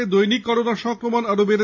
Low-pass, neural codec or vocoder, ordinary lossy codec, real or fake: 7.2 kHz; none; AAC, 48 kbps; real